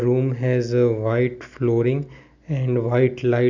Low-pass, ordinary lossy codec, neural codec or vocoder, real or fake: 7.2 kHz; none; none; real